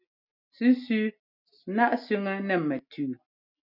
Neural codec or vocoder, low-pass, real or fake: none; 5.4 kHz; real